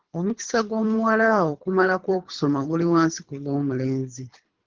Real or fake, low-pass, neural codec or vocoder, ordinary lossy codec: fake; 7.2 kHz; codec, 24 kHz, 3 kbps, HILCodec; Opus, 16 kbps